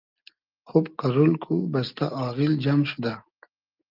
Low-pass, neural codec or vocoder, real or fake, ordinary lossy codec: 5.4 kHz; none; real; Opus, 24 kbps